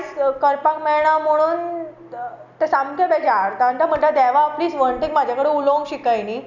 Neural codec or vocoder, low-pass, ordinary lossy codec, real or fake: none; 7.2 kHz; none; real